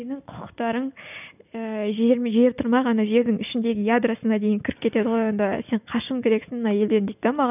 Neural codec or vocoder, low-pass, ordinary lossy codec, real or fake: none; 3.6 kHz; none; real